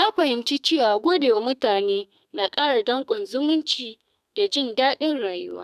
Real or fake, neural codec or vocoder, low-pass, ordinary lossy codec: fake; codec, 32 kHz, 1.9 kbps, SNAC; 14.4 kHz; none